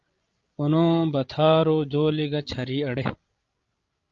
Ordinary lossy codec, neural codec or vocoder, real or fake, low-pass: Opus, 32 kbps; codec, 16 kHz, 16 kbps, FreqCodec, larger model; fake; 7.2 kHz